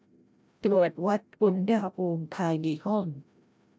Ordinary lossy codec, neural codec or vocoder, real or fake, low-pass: none; codec, 16 kHz, 0.5 kbps, FreqCodec, larger model; fake; none